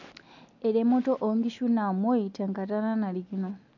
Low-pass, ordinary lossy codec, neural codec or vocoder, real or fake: 7.2 kHz; none; none; real